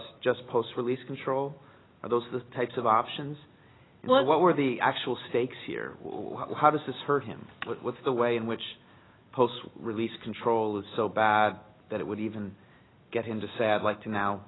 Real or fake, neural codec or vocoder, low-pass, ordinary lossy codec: real; none; 7.2 kHz; AAC, 16 kbps